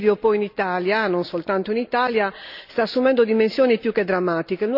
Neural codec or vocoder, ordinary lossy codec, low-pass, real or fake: none; none; 5.4 kHz; real